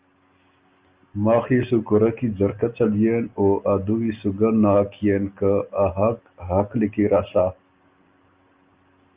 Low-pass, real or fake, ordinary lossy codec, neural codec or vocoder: 3.6 kHz; real; Opus, 24 kbps; none